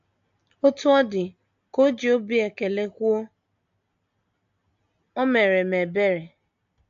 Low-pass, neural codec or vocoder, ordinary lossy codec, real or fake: 7.2 kHz; none; AAC, 64 kbps; real